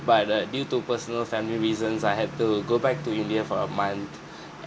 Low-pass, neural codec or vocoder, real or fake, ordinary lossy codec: none; none; real; none